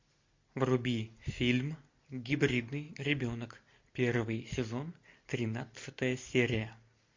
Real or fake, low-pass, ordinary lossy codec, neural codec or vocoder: real; 7.2 kHz; MP3, 48 kbps; none